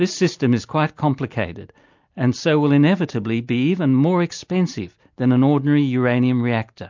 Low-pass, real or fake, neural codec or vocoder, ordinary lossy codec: 7.2 kHz; real; none; MP3, 64 kbps